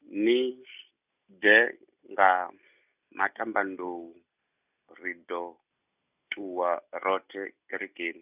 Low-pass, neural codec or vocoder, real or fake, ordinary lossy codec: 3.6 kHz; none; real; none